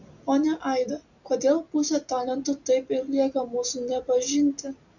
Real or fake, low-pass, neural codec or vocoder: real; 7.2 kHz; none